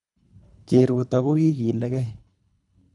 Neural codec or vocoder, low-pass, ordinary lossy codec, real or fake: codec, 24 kHz, 3 kbps, HILCodec; 10.8 kHz; none; fake